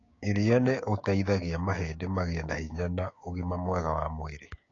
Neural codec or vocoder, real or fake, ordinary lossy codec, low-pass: codec, 16 kHz, 6 kbps, DAC; fake; AAC, 32 kbps; 7.2 kHz